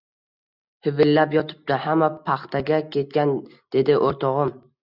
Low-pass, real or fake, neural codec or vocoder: 5.4 kHz; real; none